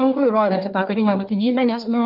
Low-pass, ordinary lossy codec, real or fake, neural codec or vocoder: 5.4 kHz; Opus, 32 kbps; fake; codec, 24 kHz, 1 kbps, SNAC